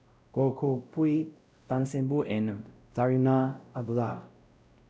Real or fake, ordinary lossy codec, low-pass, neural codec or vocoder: fake; none; none; codec, 16 kHz, 0.5 kbps, X-Codec, WavLM features, trained on Multilingual LibriSpeech